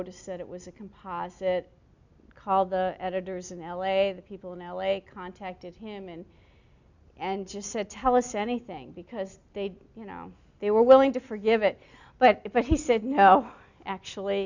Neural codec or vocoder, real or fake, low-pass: none; real; 7.2 kHz